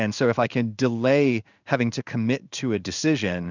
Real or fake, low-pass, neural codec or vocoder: fake; 7.2 kHz; codec, 16 kHz in and 24 kHz out, 1 kbps, XY-Tokenizer